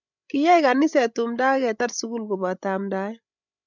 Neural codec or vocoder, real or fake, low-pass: codec, 16 kHz, 16 kbps, FreqCodec, larger model; fake; 7.2 kHz